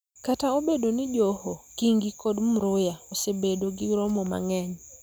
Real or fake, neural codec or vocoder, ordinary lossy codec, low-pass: real; none; none; none